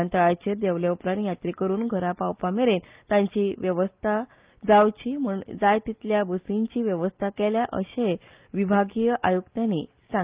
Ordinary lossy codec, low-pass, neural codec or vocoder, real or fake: Opus, 32 kbps; 3.6 kHz; none; real